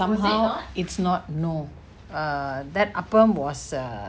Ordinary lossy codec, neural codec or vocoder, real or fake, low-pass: none; none; real; none